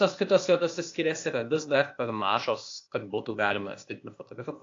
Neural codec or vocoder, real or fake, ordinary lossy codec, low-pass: codec, 16 kHz, 0.8 kbps, ZipCodec; fake; AAC, 48 kbps; 7.2 kHz